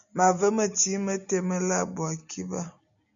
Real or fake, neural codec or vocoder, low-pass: real; none; 7.2 kHz